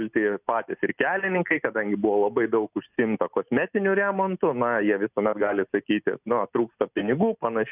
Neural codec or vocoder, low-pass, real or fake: none; 3.6 kHz; real